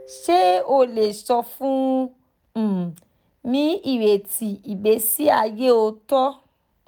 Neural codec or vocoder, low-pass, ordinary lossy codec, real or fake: none; none; none; real